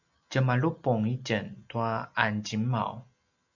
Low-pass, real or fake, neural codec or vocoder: 7.2 kHz; real; none